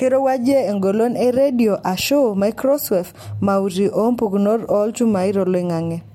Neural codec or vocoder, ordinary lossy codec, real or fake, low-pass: none; MP3, 64 kbps; real; 19.8 kHz